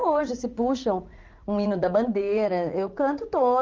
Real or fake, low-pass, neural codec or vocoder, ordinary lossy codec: fake; 7.2 kHz; vocoder, 44.1 kHz, 80 mel bands, Vocos; Opus, 32 kbps